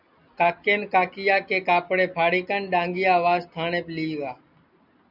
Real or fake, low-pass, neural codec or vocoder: real; 5.4 kHz; none